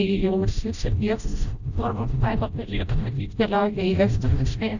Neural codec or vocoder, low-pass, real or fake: codec, 16 kHz, 0.5 kbps, FreqCodec, smaller model; 7.2 kHz; fake